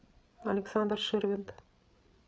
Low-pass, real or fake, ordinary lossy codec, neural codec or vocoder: none; fake; none; codec, 16 kHz, 8 kbps, FreqCodec, larger model